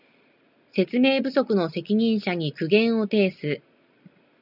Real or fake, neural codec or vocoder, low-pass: real; none; 5.4 kHz